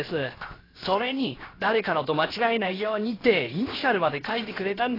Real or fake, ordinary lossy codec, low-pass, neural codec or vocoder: fake; AAC, 24 kbps; 5.4 kHz; codec, 16 kHz, 0.7 kbps, FocalCodec